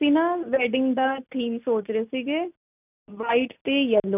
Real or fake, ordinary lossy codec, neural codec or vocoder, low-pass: real; none; none; 3.6 kHz